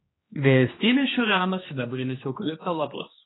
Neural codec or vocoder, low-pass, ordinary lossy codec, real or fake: codec, 16 kHz, 2 kbps, X-Codec, HuBERT features, trained on general audio; 7.2 kHz; AAC, 16 kbps; fake